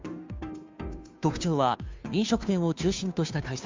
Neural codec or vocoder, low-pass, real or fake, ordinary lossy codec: codec, 16 kHz, 2 kbps, FunCodec, trained on Chinese and English, 25 frames a second; 7.2 kHz; fake; MP3, 64 kbps